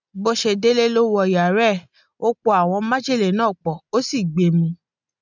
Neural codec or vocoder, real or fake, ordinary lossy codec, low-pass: none; real; none; 7.2 kHz